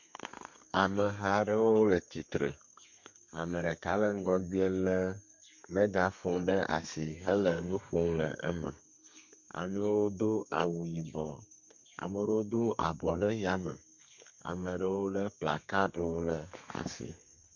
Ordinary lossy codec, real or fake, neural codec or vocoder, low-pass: MP3, 48 kbps; fake; codec, 32 kHz, 1.9 kbps, SNAC; 7.2 kHz